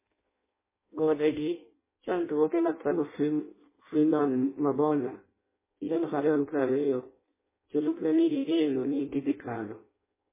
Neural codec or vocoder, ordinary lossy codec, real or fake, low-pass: codec, 16 kHz in and 24 kHz out, 0.6 kbps, FireRedTTS-2 codec; MP3, 16 kbps; fake; 3.6 kHz